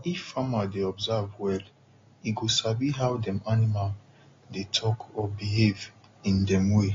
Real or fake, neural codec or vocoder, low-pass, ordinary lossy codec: real; none; 7.2 kHz; AAC, 32 kbps